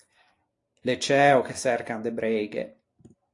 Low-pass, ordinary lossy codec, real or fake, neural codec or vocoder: 10.8 kHz; AAC, 48 kbps; real; none